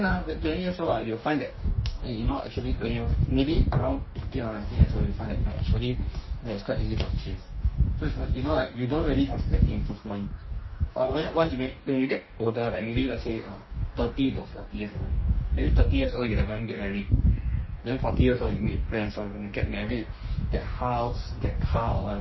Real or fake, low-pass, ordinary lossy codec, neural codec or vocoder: fake; 7.2 kHz; MP3, 24 kbps; codec, 44.1 kHz, 2.6 kbps, DAC